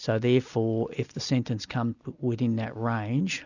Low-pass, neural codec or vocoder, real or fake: 7.2 kHz; none; real